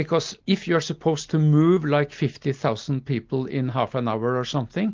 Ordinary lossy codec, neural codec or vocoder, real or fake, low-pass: Opus, 32 kbps; none; real; 7.2 kHz